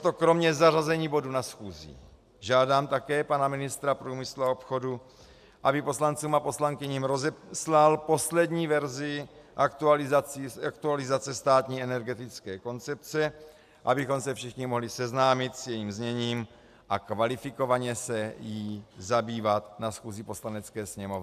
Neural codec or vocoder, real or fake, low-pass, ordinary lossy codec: none; real; 14.4 kHz; AAC, 96 kbps